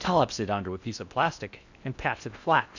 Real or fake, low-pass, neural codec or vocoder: fake; 7.2 kHz; codec, 16 kHz in and 24 kHz out, 0.6 kbps, FocalCodec, streaming, 4096 codes